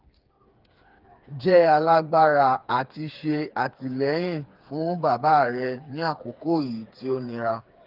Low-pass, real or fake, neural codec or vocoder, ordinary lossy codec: 5.4 kHz; fake; codec, 16 kHz, 4 kbps, FreqCodec, smaller model; Opus, 32 kbps